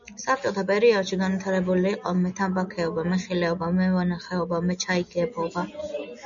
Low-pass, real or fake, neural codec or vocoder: 7.2 kHz; real; none